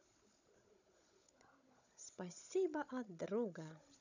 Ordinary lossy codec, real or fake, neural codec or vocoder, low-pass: none; fake; codec, 16 kHz, 16 kbps, FunCodec, trained on Chinese and English, 50 frames a second; 7.2 kHz